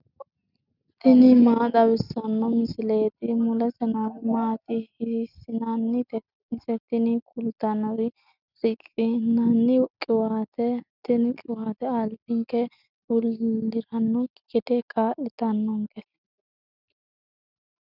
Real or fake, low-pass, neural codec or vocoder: real; 5.4 kHz; none